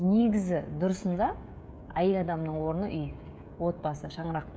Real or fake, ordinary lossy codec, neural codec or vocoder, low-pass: fake; none; codec, 16 kHz, 16 kbps, FreqCodec, smaller model; none